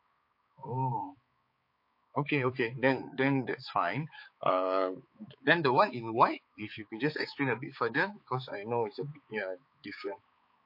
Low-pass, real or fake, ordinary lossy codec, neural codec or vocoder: 5.4 kHz; fake; MP3, 32 kbps; codec, 16 kHz, 4 kbps, X-Codec, HuBERT features, trained on balanced general audio